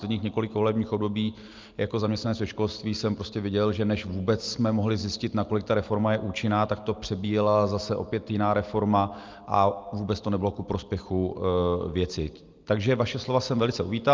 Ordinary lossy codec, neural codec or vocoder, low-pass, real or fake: Opus, 24 kbps; none; 7.2 kHz; real